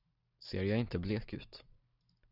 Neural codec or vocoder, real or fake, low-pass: none; real; 5.4 kHz